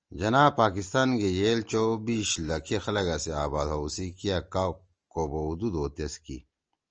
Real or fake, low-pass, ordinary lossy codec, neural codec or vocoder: real; 7.2 kHz; Opus, 24 kbps; none